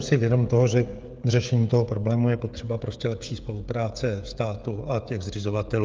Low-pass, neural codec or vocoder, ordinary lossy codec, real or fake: 7.2 kHz; codec, 16 kHz, 16 kbps, FreqCodec, smaller model; Opus, 32 kbps; fake